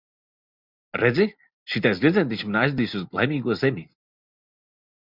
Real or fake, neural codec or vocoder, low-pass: real; none; 5.4 kHz